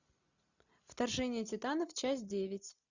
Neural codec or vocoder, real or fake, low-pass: none; real; 7.2 kHz